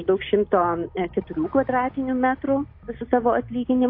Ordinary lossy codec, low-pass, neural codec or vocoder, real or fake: AAC, 32 kbps; 5.4 kHz; none; real